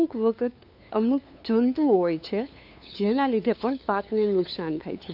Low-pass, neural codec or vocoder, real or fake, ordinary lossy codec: 5.4 kHz; codec, 16 kHz, 2 kbps, FunCodec, trained on LibriTTS, 25 frames a second; fake; none